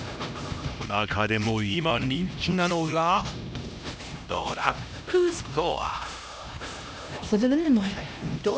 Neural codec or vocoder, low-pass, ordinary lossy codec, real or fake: codec, 16 kHz, 1 kbps, X-Codec, HuBERT features, trained on LibriSpeech; none; none; fake